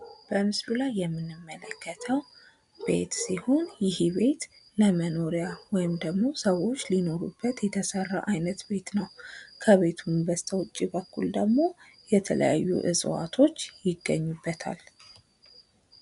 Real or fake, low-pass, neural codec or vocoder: real; 10.8 kHz; none